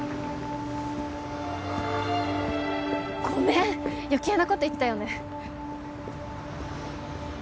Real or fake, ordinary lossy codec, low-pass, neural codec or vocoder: real; none; none; none